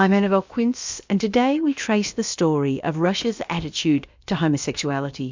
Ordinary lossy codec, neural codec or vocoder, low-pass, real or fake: MP3, 64 kbps; codec, 16 kHz, about 1 kbps, DyCAST, with the encoder's durations; 7.2 kHz; fake